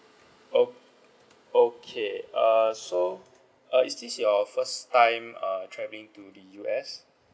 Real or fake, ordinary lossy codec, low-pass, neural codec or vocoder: real; none; none; none